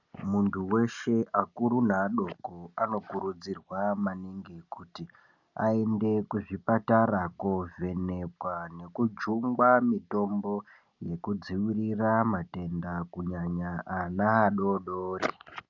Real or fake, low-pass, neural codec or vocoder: real; 7.2 kHz; none